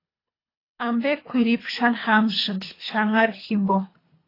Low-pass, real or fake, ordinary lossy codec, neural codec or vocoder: 5.4 kHz; fake; AAC, 24 kbps; codec, 24 kHz, 3 kbps, HILCodec